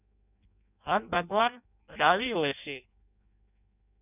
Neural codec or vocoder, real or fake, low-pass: codec, 16 kHz in and 24 kHz out, 0.6 kbps, FireRedTTS-2 codec; fake; 3.6 kHz